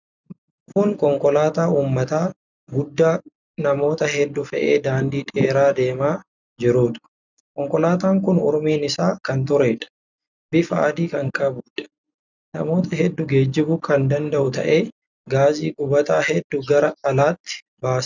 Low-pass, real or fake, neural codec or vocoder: 7.2 kHz; real; none